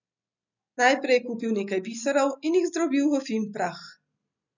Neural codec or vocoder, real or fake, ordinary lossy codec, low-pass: none; real; none; 7.2 kHz